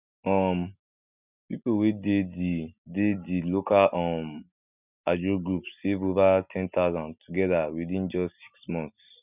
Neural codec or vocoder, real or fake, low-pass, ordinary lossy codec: none; real; 3.6 kHz; none